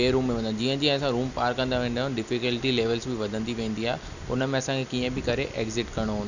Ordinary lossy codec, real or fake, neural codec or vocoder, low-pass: none; real; none; 7.2 kHz